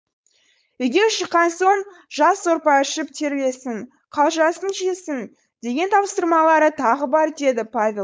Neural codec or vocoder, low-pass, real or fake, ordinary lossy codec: codec, 16 kHz, 4.8 kbps, FACodec; none; fake; none